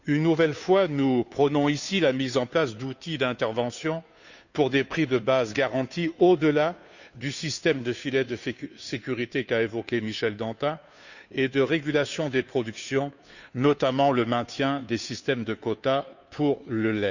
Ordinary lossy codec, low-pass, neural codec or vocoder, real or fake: none; 7.2 kHz; codec, 16 kHz, 2 kbps, FunCodec, trained on Chinese and English, 25 frames a second; fake